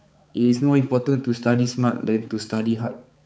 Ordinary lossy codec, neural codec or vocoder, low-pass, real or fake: none; codec, 16 kHz, 4 kbps, X-Codec, HuBERT features, trained on balanced general audio; none; fake